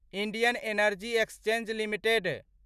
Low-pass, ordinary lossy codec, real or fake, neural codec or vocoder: 14.4 kHz; none; real; none